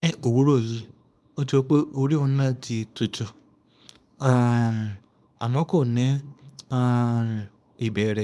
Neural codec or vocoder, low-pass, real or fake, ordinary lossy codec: codec, 24 kHz, 0.9 kbps, WavTokenizer, small release; none; fake; none